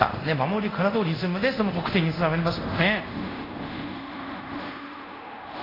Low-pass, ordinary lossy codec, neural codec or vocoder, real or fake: 5.4 kHz; AAC, 24 kbps; codec, 24 kHz, 0.5 kbps, DualCodec; fake